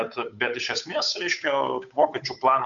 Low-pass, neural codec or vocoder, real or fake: 7.2 kHz; codec, 16 kHz, 8 kbps, FunCodec, trained on Chinese and English, 25 frames a second; fake